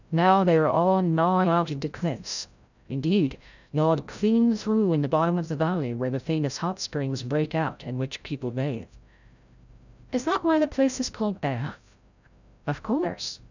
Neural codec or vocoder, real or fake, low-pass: codec, 16 kHz, 0.5 kbps, FreqCodec, larger model; fake; 7.2 kHz